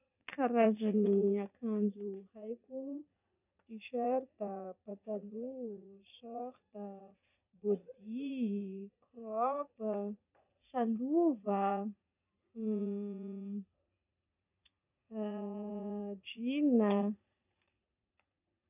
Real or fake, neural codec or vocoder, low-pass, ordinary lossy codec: fake; vocoder, 44.1 kHz, 80 mel bands, Vocos; 3.6 kHz; none